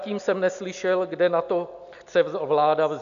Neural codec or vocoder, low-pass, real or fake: none; 7.2 kHz; real